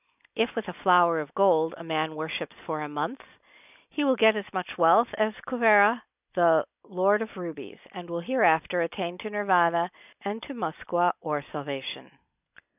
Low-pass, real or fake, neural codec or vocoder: 3.6 kHz; real; none